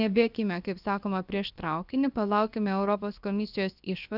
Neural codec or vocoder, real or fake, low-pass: codec, 16 kHz, about 1 kbps, DyCAST, with the encoder's durations; fake; 5.4 kHz